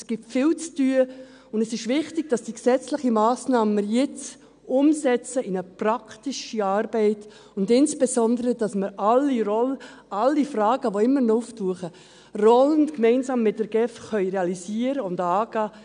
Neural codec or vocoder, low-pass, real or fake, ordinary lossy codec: none; 9.9 kHz; real; none